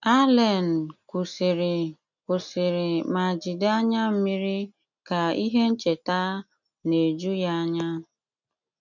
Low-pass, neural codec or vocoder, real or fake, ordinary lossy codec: 7.2 kHz; none; real; none